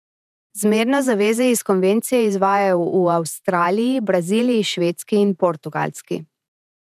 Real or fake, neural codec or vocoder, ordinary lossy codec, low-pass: fake; vocoder, 48 kHz, 128 mel bands, Vocos; none; 14.4 kHz